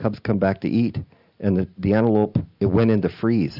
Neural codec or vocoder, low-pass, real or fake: none; 5.4 kHz; real